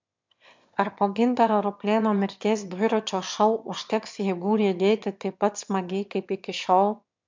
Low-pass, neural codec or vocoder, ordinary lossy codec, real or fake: 7.2 kHz; autoencoder, 22.05 kHz, a latent of 192 numbers a frame, VITS, trained on one speaker; MP3, 64 kbps; fake